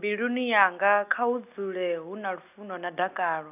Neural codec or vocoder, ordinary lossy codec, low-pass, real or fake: none; none; 3.6 kHz; real